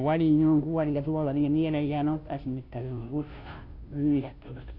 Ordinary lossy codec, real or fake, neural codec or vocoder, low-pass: none; fake; codec, 16 kHz, 0.5 kbps, FunCodec, trained on Chinese and English, 25 frames a second; 5.4 kHz